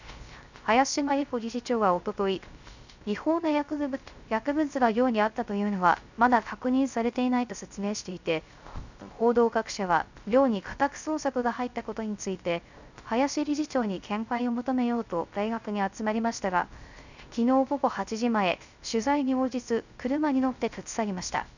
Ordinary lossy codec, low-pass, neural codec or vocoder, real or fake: none; 7.2 kHz; codec, 16 kHz, 0.3 kbps, FocalCodec; fake